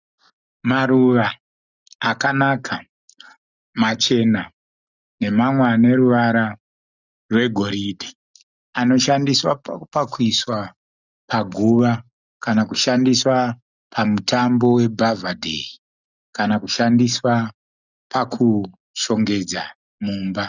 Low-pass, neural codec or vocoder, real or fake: 7.2 kHz; none; real